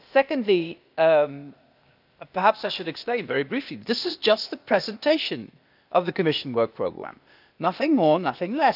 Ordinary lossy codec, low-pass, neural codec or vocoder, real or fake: none; 5.4 kHz; codec, 16 kHz, 0.8 kbps, ZipCodec; fake